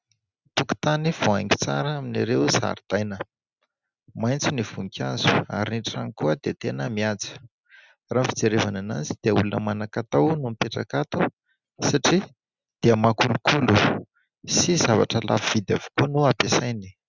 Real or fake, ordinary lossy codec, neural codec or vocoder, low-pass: real; Opus, 64 kbps; none; 7.2 kHz